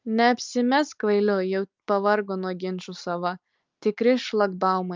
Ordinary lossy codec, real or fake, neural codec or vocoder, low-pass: Opus, 24 kbps; real; none; 7.2 kHz